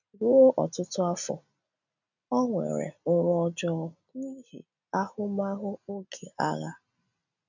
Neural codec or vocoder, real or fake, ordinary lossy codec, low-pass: none; real; none; 7.2 kHz